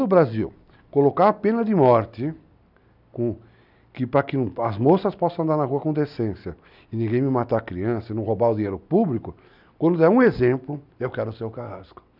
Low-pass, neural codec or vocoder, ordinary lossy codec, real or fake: 5.4 kHz; none; none; real